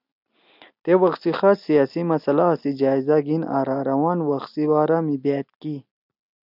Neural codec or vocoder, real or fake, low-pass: none; real; 5.4 kHz